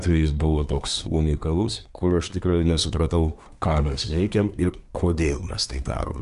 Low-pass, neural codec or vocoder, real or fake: 10.8 kHz; codec, 24 kHz, 1 kbps, SNAC; fake